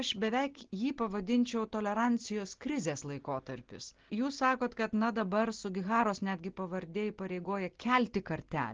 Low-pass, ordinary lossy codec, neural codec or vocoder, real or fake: 7.2 kHz; Opus, 16 kbps; none; real